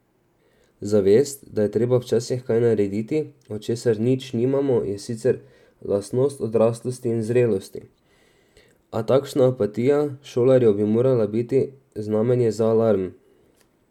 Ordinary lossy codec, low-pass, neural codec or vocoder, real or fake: none; 19.8 kHz; none; real